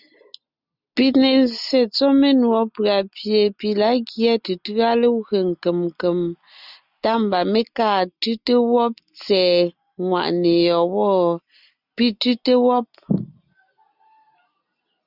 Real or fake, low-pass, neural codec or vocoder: real; 5.4 kHz; none